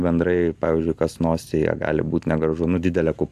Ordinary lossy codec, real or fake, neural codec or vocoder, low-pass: AAC, 96 kbps; real; none; 14.4 kHz